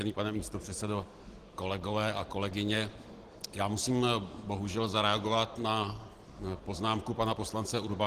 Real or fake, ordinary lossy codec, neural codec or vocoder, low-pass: real; Opus, 16 kbps; none; 14.4 kHz